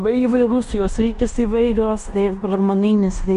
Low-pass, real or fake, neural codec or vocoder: 10.8 kHz; fake; codec, 16 kHz in and 24 kHz out, 0.9 kbps, LongCat-Audio-Codec, fine tuned four codebook decoder